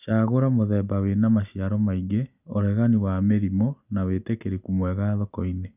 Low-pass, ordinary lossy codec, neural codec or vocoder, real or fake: 3.6 kHz; none; none; real